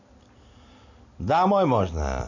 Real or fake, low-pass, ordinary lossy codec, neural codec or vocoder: real; 7.2 kHz; none; none